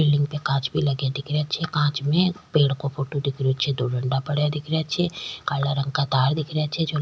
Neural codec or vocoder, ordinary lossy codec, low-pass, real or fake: none; none; none; real